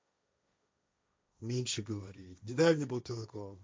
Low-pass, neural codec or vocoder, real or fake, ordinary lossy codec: none; codec, 16 kHz, 1.1 kbps, Voila-Tokenizer; fake; none